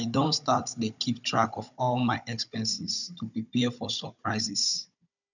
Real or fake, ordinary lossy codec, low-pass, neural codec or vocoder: fake; none; 7.2 kHz; codec, 16 kHz, 16 kbps, FunCodec, trained on Chinese and English, 50 frames a second